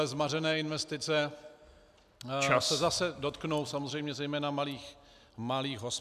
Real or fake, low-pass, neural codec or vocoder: real; 14.4 kHz; none